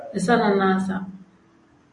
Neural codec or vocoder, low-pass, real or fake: none; 10.8 kHz; real